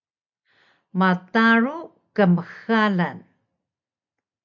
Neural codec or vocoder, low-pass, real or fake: none; 7.2 kHz; real